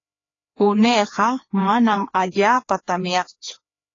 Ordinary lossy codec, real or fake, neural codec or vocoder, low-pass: AAC, 32 kbps; fake; codec, 16 kHz, 2 kbps, FreqCodec, larger model; 7.2 kHz